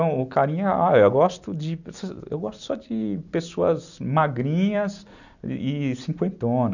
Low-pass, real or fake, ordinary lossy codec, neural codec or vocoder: 7.2 kHz; real; none; none